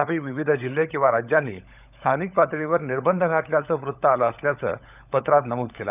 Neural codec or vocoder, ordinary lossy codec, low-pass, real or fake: codec, 16 kHz, 16 kbps, FunCodec, trained on LibriTTS, 50 frames a second; none; 3.6 kHz; fake